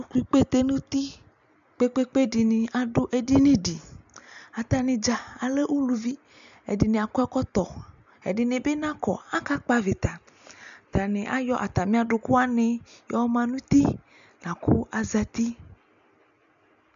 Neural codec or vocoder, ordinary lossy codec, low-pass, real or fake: none; AAC, 96 kbps; 7.2 kHz; real